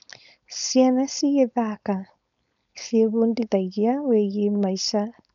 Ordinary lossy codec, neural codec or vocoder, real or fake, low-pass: none; codec, 16 kHz, 4.8 kbps, FACodec; fake; 7.2 kHz